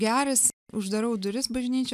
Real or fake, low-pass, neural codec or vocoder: real; 14.4 kHz; none